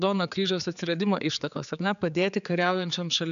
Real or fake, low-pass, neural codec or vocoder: fake; 7.2 kHz; codec, 16 kHz, 4 kbps, X-Codec, HuBERT features, trained on general audio